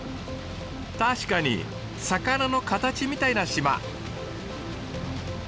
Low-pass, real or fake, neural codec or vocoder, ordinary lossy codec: none; real; none; none